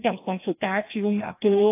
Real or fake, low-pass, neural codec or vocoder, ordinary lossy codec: fake; 3.6 kHz; codec, 16 kHz, 1 kbps, FreqCodec, larger model; AAC, 24 kbps